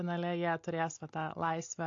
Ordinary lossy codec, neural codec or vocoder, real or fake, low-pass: AAC, 48 kbps; none; real; 7.2 kHz